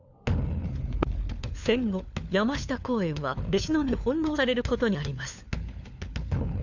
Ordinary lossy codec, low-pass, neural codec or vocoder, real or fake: none; 7.2 kHz; codec, 16 kHz, 4 kbps, FunCodec, trained on LibriTTS, 50 frames a second; fake